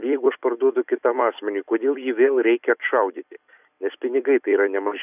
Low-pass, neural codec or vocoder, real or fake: 3.6 kHz; vocoder, 44.1 kHz, 128 mel bands every 256 samples, BigVGAN v2; fake